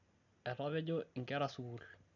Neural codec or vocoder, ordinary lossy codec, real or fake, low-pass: none; none; real; 7.2 kHz